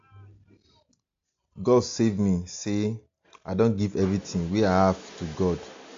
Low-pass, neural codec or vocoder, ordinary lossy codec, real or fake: 7.2 kHz; none; AAC, 48 kbps; real